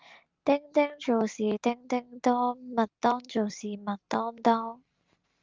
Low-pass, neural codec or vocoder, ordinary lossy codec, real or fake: 7.2 kHz; none; Opus, 32 kbps; real